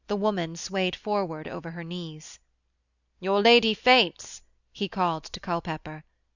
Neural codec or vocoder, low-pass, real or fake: none; 7.2 kHz; real